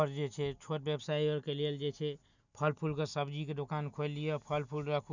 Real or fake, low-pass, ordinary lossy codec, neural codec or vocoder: real; 7.2 kHz; none; none